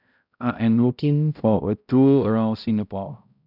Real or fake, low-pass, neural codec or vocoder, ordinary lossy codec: fake; 5.4 kHz; codec, 16 kHz, 0.5 kbps, X-Codec, HuBERT features, trained on balanced general audio; none